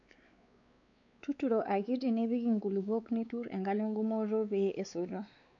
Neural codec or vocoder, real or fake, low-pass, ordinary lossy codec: codec, 16 kHz, 4 kbps, X-Codec, WavLM features, trained on Multilingual LibriSpeech; fake; 7.2 kHz; none